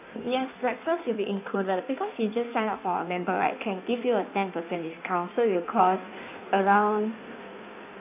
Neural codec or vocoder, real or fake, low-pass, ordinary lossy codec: codec, 16 kHz in and 24 kHz out, 1.1 kbps, FireRedTTS-2 codec; fake; 3.6 kHz; none